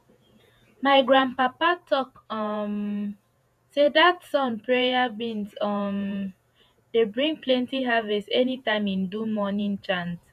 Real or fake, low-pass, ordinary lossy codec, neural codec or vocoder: fake; 14.4 kHz; none; vocoder, 48 kHz, 128 mel bands, Vocos